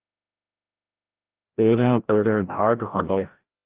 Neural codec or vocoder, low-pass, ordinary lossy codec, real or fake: codec, 16 kHz, 0.5 kbps, FreqCodec, larger model; 3.6 kHz; Opus, 16 kbps; fake